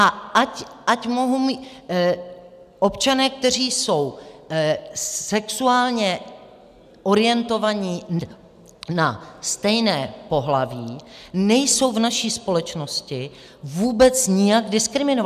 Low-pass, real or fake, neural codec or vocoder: 14.4 kHz; real; none